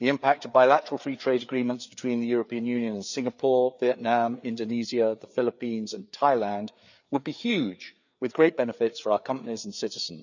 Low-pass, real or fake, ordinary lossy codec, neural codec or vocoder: 7.2 kHz; fake; none; codec, 16 kHz, 4 kbps, FreqCodec, larger model